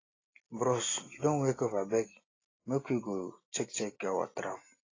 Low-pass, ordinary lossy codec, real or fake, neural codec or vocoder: 7.2 kHz; AAC, 32 kbps; real; none